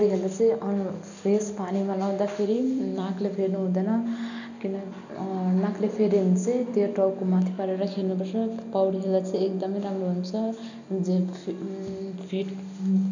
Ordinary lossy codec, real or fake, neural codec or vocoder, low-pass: none; real; none; 7.2 kHz